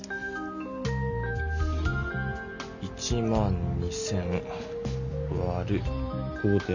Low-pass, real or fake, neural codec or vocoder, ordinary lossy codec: 7.2 kHz; real; none; none